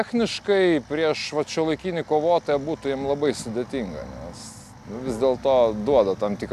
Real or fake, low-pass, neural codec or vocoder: real; 14.4 kHz; none